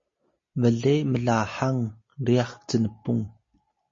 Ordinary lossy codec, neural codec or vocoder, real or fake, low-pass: MP3, 32 kbps; none; real; 7.2 kHz